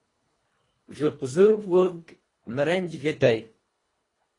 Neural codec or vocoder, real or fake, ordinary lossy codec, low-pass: codec, 24 kHz, 1.5 kbps, HILCodec; fake; AAC, 32 kbps; 10.8 kHz